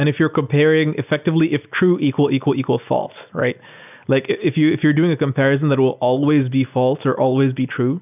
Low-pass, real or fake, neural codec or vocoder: 3.6 kHz; real; none